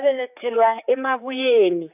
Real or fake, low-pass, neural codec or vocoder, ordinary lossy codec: fake; 3.6 kHz; codec, 16 kHz, 4 kbps, X-Codec, HuBERT features, trained on general audio; none